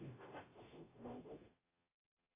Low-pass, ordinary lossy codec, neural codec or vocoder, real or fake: 3.6 kHz; AAC, 16 kbps; codec, 44.1 kHz, 0.9 kbps, DAC; fake